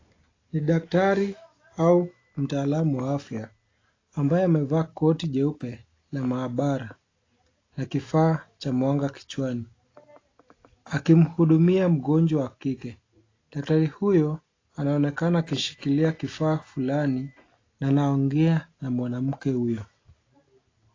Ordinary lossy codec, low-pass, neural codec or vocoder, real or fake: AAC, 32 kbps; 7.2 kHz; none; real